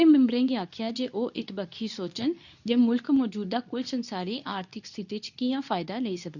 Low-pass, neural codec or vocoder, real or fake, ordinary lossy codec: 7.2 kHz; codec, 24 kHz, 0.9 kbps, WavTokenizer, medium speech release version 2; fake; none